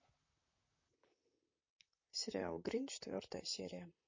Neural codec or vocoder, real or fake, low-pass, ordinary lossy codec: vocoder, 44.1 kHz, 128 mel bands, Pupu-Vocoder; fake; 7.2 kHz; MP3, 32 kbps